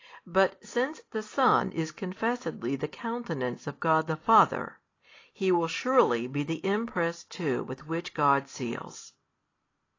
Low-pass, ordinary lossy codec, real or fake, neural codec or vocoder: 7.2 kHz; AAC, 32 kbps; real; none